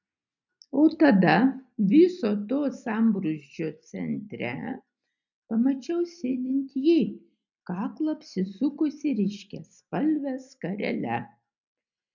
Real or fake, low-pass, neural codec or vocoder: real; 7.2 kHz; none